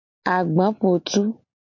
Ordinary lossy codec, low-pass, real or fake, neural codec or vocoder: MP3, 48 kbps; 7.2 kHz; real; none